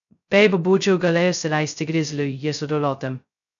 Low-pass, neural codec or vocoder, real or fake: 7.2 kHz; codec, 16 kHz, 0.2 kbps, FocalCodec; fake